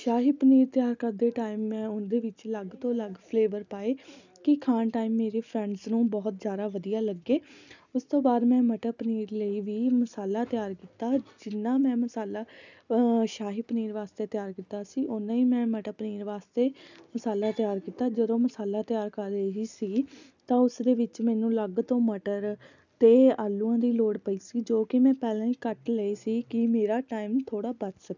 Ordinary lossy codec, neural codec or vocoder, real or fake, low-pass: none; none; real; 7.2 kHz